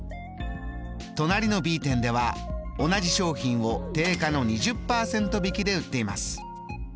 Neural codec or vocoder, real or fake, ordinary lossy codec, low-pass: none; real; none; none